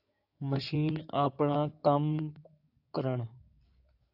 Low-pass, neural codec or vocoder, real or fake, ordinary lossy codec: 5.4 kHz; codec, 16 kHz in and 24 kHz out, 2.2 kbps, FireRedTTS-2 codec; fake; AAC, 48 kbps